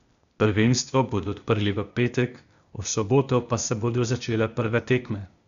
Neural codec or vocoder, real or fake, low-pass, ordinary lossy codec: codec, 16 kHz, 0.8 kbps, ZipCodec; fake; 7.2 kHz; none